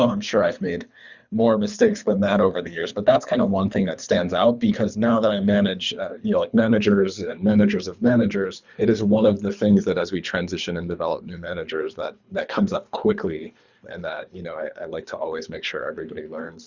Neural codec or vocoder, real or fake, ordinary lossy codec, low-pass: codec, 24 kHz, 3 kbps, HILCodec; fake; Opus, 64 kbps; 7.2 kHz